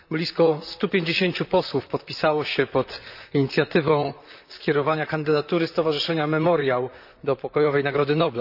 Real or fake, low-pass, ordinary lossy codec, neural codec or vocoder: fake; 5.4 kHz; none; vocoder, 44.1 kHz, 128 mel bands, Pupu-Vocoder